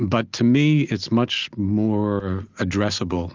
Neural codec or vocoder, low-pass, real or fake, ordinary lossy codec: none; 7.2 kHz; real; Opus, 32 kbps